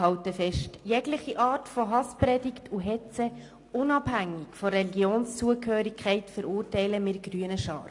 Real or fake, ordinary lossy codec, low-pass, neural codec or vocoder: real; AAC, 48 kbps; 10.8 kHz; none